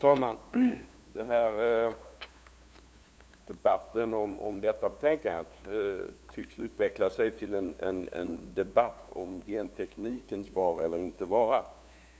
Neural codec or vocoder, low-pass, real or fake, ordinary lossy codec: codec, 16 kHz, 2 kbps, FunCodec, trained on LibriTTS, 25 frames a second; none; fake; none